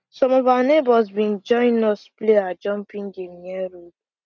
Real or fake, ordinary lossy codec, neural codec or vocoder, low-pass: real; none; none; 7.2 kHz